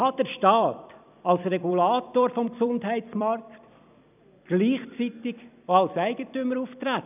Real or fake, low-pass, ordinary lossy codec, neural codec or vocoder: fake; 3.6 kHz; none; vocoder, 44.1 kHz, 128 mel bands every 256 samples, BigVGAN v2